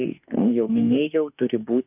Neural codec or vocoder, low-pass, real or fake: autoencoder, 48 kHz, 32 numbers a frame, DAC-VAE, trained on Japanese speech; 3.6 kHz; fake